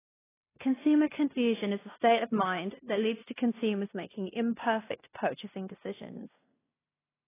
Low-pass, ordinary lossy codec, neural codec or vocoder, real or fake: 3.6 kHz; AAC, 16 kbps; codec, 16 kHz in and 24 kHz out, 0.9 kbps, LongCat-Audio-Codec, fine tuned four codebook decoder; fake